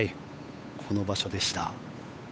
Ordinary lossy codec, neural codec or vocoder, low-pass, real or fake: none; codec, 16 kHz, 8 kbps, FunCodec, trained on Chinese and English, 25 frames a second; none; fake